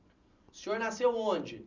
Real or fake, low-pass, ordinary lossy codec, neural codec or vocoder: real; 7.2 kHz; none; none